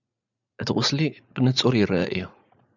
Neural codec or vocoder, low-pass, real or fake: vocoder, 44.1 kHz, 80 mel bands, Vocos; 7.2 kHz; fake